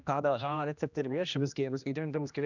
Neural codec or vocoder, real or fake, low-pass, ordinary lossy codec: codec, 16 kHz, 1 kbps, X-Codec, HuBERT features, trained on general audio; fake; 7.2 kHz; none